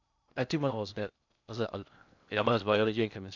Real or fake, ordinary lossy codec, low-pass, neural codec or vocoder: fake; none; 7.2 kHz; codec, 16 kHz in and 24 kHz out, 0.6 kbps, FocalCodec, streaming, 2048 codes